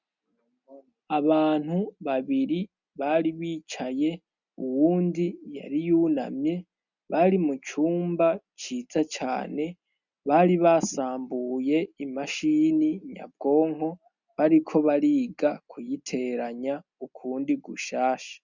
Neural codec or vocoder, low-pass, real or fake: none; 7.2 kHz; real